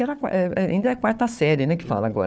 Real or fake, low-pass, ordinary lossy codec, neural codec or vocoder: fake; none; none; codec, 16 kHz, 4 kbps, FunCodec, trained on Chinese and English, 50 frames a second